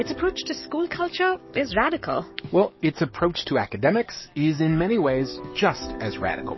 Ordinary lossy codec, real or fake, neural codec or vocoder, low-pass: MP3, 24 kbps; fake; codec, 44.1 kHz, 7.8 kbps, DAC; 7.2 kHz